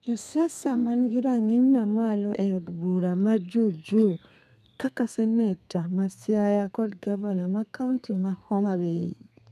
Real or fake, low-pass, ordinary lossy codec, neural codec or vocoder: fake; 14.4 kHz; none; codec, 32 kHz, 1.9 kbps, SNAC